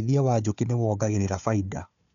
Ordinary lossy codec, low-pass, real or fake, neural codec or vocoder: none; 7.2 kHz; fake; codec, 16 kHz, 4 kbps, FunCodec, trained on Chinese and English, 50 frames a second